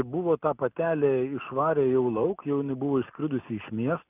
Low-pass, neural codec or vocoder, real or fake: 3.6 kHz; none; real